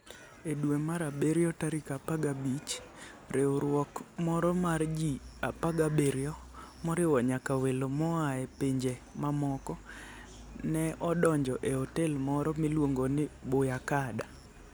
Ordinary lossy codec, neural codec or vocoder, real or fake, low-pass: none; none; real; none